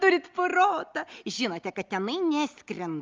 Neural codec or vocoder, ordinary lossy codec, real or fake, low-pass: none; Opus, 32 kbps; real; 7.2 kHz